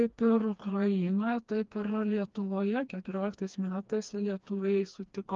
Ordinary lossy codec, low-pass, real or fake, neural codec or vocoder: Opus, 32 kbps; 7.2 kHz; fake; codec, 16 kHz, 2 kbps, FreqCodec, smaller model